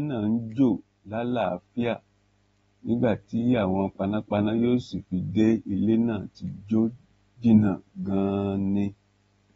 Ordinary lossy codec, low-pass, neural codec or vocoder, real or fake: AAC, 24 kbps; 19.8 kHz; none; real